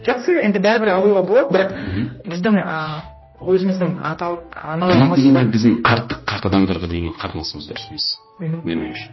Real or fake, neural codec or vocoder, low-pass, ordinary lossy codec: fake; codec, 16 kHz, 1 kbps, X-Codec, HuBERT features, trained on general audio; 7.2 kHz; MP3, 24 kbps